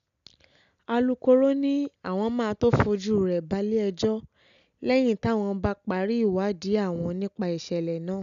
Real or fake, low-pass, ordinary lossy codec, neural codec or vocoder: real; 7.2 kHz; none; none